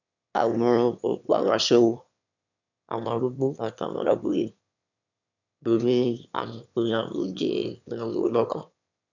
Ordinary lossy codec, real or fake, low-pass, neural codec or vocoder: none; fake; 7.2 kHz; autoencoder, 22.05 kHz, a latent of 192 numbers a frame, VITS, trained on one speaker